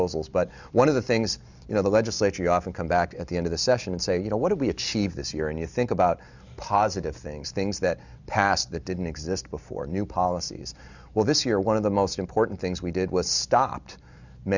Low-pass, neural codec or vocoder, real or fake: 7.2 kHz; none; real